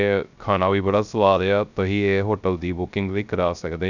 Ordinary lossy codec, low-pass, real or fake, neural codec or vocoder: none; 7.2 kHz; fake; codec, 16 kHz, 0.3 kbps, FocalCodec